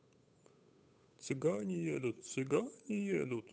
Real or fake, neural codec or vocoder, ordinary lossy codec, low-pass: fake; codec, 16 kHz, 8 kbps, FunCodec, trained on Chinese and English, 25 frames a second; none; none